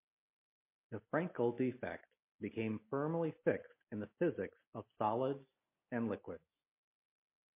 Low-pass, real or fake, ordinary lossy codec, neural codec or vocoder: 3.6 kHz; real; MP3, 24 kbps; none